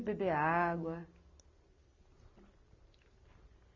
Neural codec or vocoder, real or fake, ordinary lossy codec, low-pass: none; real; none; 7.2 kHz